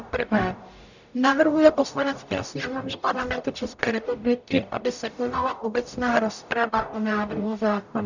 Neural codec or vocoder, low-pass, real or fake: codec, 44.1 kHz, 0.9 kbps, DAC; 7.2 kHz; fake